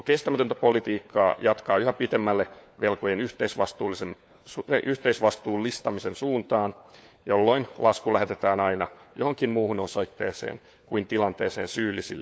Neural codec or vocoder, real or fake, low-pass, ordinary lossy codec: codec, 16 kHz, 4 kbps, FunCodec, trained on LibriTTS, 50 frames a second; fake; none; none